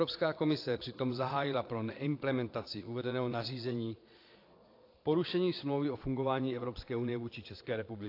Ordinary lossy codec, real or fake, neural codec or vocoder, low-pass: AAC, 32 kbps; fake; vocoder, 22.05 kHz, 80 mel bands, Vocos; 5.4 kHz